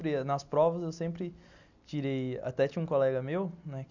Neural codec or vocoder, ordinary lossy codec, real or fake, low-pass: none; none; real; 7.2 kHz